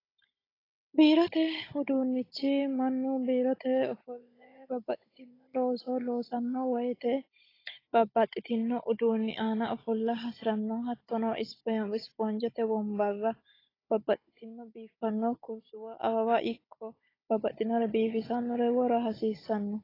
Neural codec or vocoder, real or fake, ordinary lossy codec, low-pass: codec, 16 kHz, 16 kbps, FunCodec, trained on Chinese and English, 50 frames a second; fake; AAC, 24 kbps; 5.4 kHz